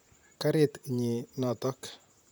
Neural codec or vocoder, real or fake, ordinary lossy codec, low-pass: vocoder, 44.1 kHz, 128 mel bands, Pupu-Vocoder; fake; none; none